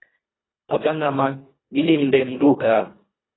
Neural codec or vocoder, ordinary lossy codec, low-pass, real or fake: codec, 24 kHz, 1.5 kbps, HILCodec; AAC, 16 kbps; 7.2 kHz; fake